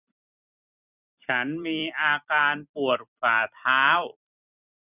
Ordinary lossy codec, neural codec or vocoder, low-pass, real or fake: none; vocoder, 44.1 kHz, 128 mel bands every 256 samples, BigVGAN v2; 3.6 kHz; fake